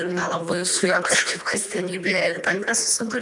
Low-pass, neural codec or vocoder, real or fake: 10.8 kHz; codec, 24 kHz, 1.5 kbps, HILCodec; fake